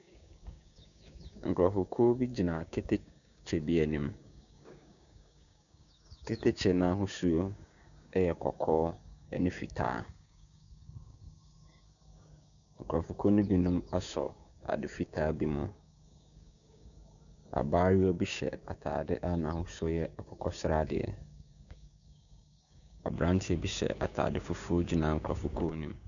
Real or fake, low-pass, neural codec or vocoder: fake; 7.2 kHz; codec, 16 kHz, 6 kbps, DAC